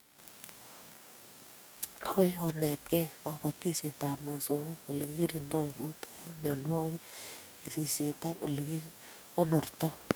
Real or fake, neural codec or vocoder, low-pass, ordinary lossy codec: fake; codec, 44.1 kHz, 2.6 kbps, DAC; none; none